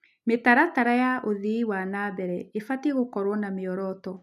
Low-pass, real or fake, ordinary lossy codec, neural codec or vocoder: 14.4 kHz; real; none; none